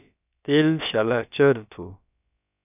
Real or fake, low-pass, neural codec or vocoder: fake; 3.6 kHz; codec, 16 kHz, about 1 kbps, DyCAST, with the encoder's durations